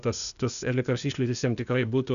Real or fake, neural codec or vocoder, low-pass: fake; codec, 16 kHz, 0.8 kbps, ZipCodec; 7.2 kHz